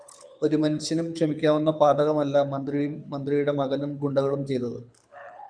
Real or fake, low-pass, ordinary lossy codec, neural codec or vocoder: fake; 9.9 kHz; MP3, 96 kbps; codec, 24 kHz, 6 kbps, HILCodec